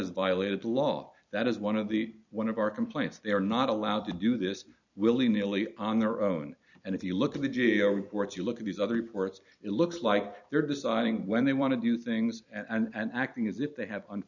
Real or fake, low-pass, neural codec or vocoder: fake; 7.2 kHz; vocoder, 44.1 kHz, 128 mel bands every 256 samples, BigVGAN v2